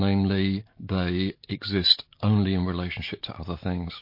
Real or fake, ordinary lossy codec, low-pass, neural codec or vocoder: real; MP3, 32 kbps; 5.4 kHz; none